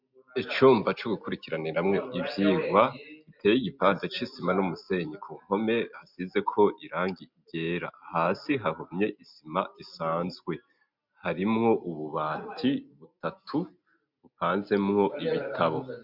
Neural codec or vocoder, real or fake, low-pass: none; real; 5.4 kHz